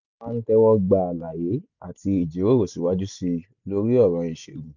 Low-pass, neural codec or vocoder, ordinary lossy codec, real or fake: 7.2 kHz; none; none; real